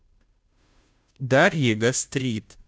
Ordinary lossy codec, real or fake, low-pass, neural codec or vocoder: none; fake; none; codec, 16 kHz, 0.5 kbps, FunCodec, trained on Chinese and English, 25 frames a second